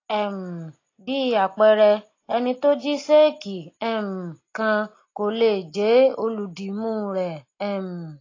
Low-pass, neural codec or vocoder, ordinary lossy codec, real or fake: 7.2 kHz; none; AAC, 32 kbps; real